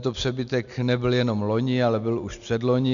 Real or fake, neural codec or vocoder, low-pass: real; none; 7.2 kHz